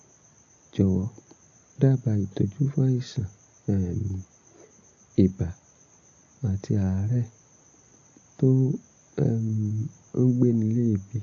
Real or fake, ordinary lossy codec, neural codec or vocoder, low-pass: real; AAC, 48 kbps; none; 7.2 kHz